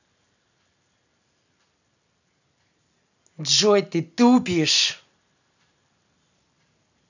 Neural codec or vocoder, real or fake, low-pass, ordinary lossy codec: none; real; 7.2 kHz; none